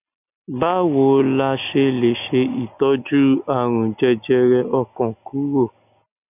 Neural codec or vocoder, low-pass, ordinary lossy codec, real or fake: none; 3.6 kHz; none; real